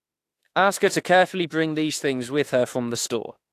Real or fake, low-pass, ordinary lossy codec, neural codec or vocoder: fake; 14.4 kHz; AAC, 64 kbps; autoencoder, 48 kHz, 32 numbers a frame, DAC-VAE, trained on Japanese speech